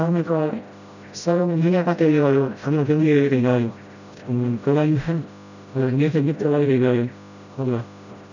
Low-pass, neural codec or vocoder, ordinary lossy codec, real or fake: 7.2 kHz; codec, 16 kHz, 0.5 kbps, FreqCodec, smaller model; none; fake